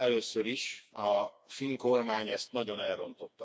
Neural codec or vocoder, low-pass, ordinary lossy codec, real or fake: codec, 16 kHz, 2 kbps, FreqCodec, smaller model; none; none; fake